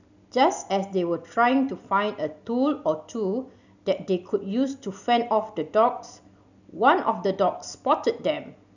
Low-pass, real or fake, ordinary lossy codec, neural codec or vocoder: 7.2 kHz; real; none; none